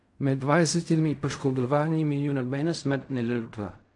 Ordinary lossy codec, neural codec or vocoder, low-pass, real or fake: AAC, 64 kbps; codec, 16 kHz in and 24 kHz out, 0.4 kbps, LongCat-Audio-Codec, fine tuned four codebook decoder; 10.8 kHz; fake